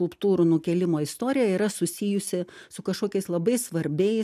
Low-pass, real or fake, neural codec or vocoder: 14.4 kHz; real; none